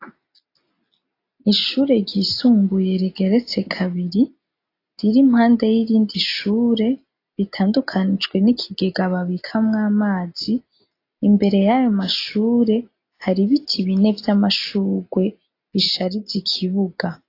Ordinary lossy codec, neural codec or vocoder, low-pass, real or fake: AAC, 32 kbps; none; 5.4 kHz; real